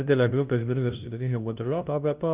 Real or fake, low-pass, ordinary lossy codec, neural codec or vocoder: fake; 3.6 kHz; Opus, 32 kbps; codec, 16 kHz, 0.5 kbps, FunCodec, trained on LibriTTS, 25 frames a second